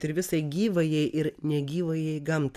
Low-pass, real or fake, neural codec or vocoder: 14.4 kHz; real; none